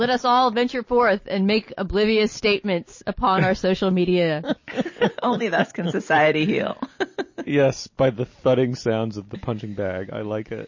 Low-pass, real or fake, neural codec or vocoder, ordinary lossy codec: 7.2 kHz; real; none; MP3, 32 kbps